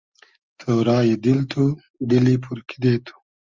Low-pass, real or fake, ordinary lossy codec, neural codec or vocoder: 7.2 kHz; real; Opus, 32 kbps; none